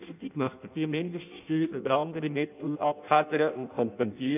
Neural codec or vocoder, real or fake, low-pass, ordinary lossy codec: codec, 16 kHz in and 24 kHz out, 0.6 kbps, FireRedTTS-2 codec; fake; 3.6 kHz; none